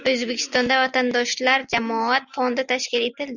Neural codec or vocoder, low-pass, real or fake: none; 7.2 kHz; real